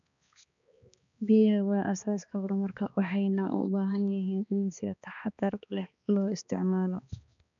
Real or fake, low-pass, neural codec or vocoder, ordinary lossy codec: fake; 7.2 kHz; codec, 16 kHz, 2 kbps, X-Codec, HuBERT features, trained on balanced general audio; none